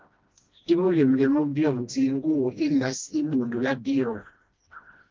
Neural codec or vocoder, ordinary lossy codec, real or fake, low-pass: codec, 16 kHz, 1 kbps, FreqCodec, smaller model; Opus, 32 kbps; fake; 7.2 kHz